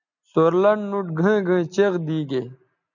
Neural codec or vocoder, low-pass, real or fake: none; 7.2 kHz; real